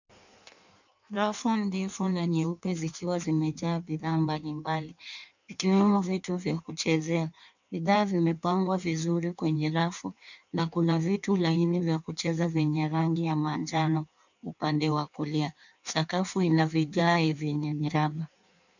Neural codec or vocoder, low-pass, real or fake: codec, 16 kHz in and 24 kHz out, 1.1 kbps, FireRedTTS-2 codec; 7.2 kHz; fake